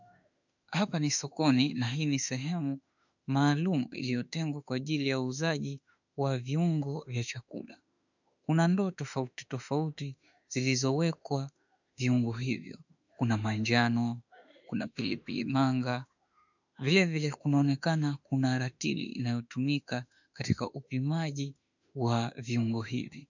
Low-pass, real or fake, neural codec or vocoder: 7.2 kHz; fake; autoencoder, 48 kHz, 32 numbers a frame, DAC-VAE, trained on Japanese speech